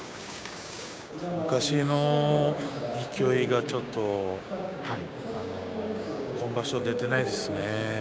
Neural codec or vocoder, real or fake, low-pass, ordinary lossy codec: codec, 16 kHz, 6 kbps, DAC; fake; none; none